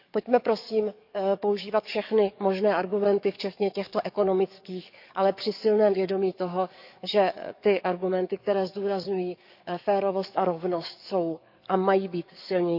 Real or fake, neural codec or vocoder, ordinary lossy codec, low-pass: fake; codec, 44.1 kHz, 7.8 kbps, DAC; none; 5.4 kHz